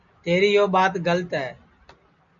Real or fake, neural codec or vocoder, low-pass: real; none; 7.2 kHz